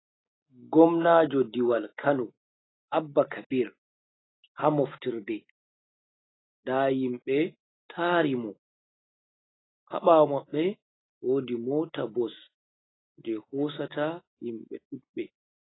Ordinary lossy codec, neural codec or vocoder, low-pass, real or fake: AAC, 16 kbps; none; 7.2 kHz; real